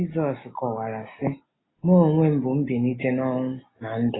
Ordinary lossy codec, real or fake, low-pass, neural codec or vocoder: AAC, 16 kbps; real; 7.2 kHz; none